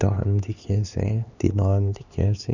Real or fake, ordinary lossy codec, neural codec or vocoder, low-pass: fake; none; codec, 16 kHz, 2 kbps, X-Codec, HuBERT features, trained on LibriSpeech; 7.2 kHz